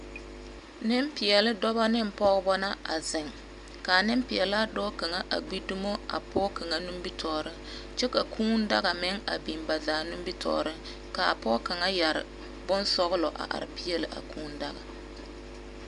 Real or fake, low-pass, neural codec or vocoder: real; 10.8 kHz; none